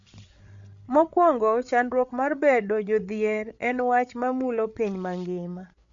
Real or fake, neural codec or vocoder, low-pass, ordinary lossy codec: fake; codec, 16 kHz, 8 kbps, FreqCodec, larger model; 7.2 kHz; MP3, 64 kbps